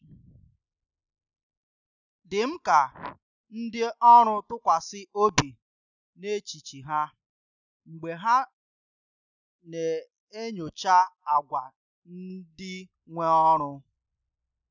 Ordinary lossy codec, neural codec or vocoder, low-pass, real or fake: none; none; 7.2 kHz; real